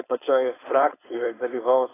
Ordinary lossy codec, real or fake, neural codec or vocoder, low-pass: AAC, 16 kbps; fake; codec, 16 kHz, 4.8 kbps, FACodec; 3.6 kHz